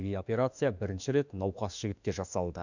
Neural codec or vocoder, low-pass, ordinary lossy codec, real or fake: autoencoder, 48 kHz, 32 numbers a frame, DAC-VAE, trained on Japanese speech; 7.2 kHz; none; fake